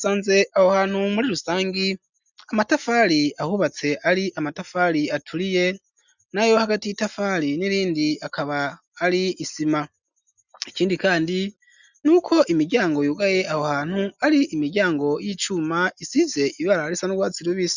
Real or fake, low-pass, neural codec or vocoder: real; 7.2 kHz; none